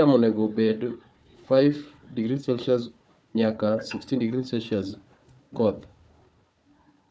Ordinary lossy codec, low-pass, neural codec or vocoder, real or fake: none; none; codec, 16 kHz, 4 kbps, FunCodec, trained on Chinese and English, 50 frames a second; fake